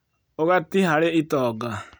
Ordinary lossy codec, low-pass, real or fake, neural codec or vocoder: none; none; real; none